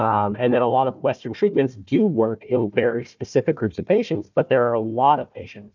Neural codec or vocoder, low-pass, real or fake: codec, 16 kHz, 1 kbps, FunCodec, trained on Chinese and English, 50 frames a second; 7.2 kHz; fake